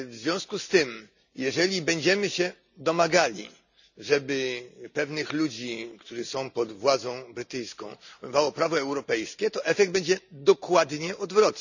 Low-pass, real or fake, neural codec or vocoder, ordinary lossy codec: 7.2 kHz; real; none; none